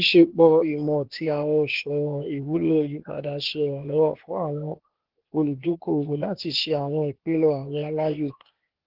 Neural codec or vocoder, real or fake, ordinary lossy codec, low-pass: codec, 16 kHz, 0.8 kbps, ZipCodec; fake; Opus, 16 kbps; 5.4 kHz